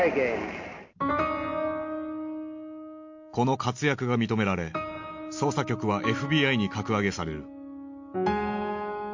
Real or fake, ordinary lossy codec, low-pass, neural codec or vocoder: real; MP3, 48 kbps; 7.2 kHz; none